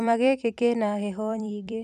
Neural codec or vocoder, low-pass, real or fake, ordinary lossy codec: vocoder, 44.1 kHz, 128 mel bands every 256 samples, BigVGAN v2; 14.4 kHz; fake; none